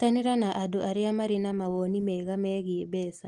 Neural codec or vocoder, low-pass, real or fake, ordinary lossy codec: none; 10.8 kHz; real; Opus, 24 kbps